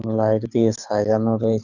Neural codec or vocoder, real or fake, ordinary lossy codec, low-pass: codec, 24 kHz, 6 kbps, HILCodec; fake; none; 7.2 kHz